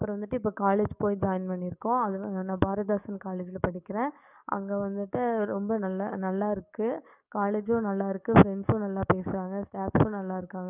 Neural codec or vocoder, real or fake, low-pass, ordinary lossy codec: codec, 44.1 kHz, 7.8 kbps, DAC; fake; 3.6 kHz; none